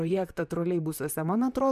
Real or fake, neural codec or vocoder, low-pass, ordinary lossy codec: fake; vocoder, 44.1 kHz, 128 mel bands, Pupu-Vocoder; 14.4 kHz; MP3, 96 kbps